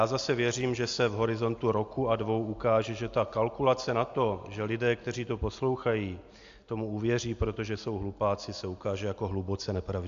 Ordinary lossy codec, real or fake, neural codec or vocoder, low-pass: AAC, 64 kbps; real; none; 7.2 kHz